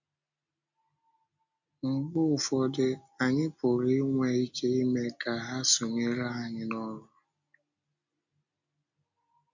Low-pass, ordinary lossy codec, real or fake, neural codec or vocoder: 7.2 kHz; none; real; none